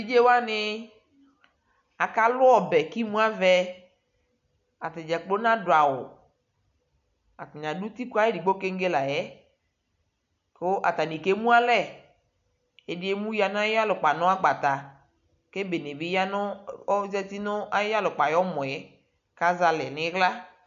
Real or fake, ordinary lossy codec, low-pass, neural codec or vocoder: real; MP3, 96 kbps; 7.2 kHz; none